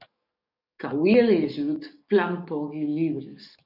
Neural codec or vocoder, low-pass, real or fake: codec, 44.1 kHz, 7.8 kbps, DAC; 5.4 kHz; fake